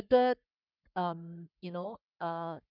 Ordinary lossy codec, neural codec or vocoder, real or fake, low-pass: none; codec, 44.1 kHz, 3.4 kbps, Pupu-Codec; fake; 5.4 kHz